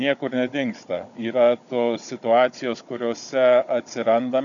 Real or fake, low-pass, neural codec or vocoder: fake; 7.2 kHz; codec, 16 kHz, 16 kbps, FunCodec, trained on Chinese and English, 50 frames a second